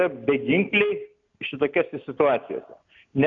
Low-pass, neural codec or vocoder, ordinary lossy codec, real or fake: 7.2 kHz; none; AAC, 64 kbps; real